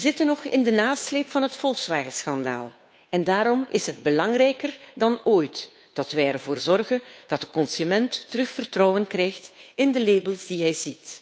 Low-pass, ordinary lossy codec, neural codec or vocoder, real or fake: none; none; codec, 16 kHz, 2 kbps, FunCodec, trained on Chinese and English, 25 frames a second; fake